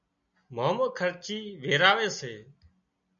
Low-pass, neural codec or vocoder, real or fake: 7.2 kHz; none; real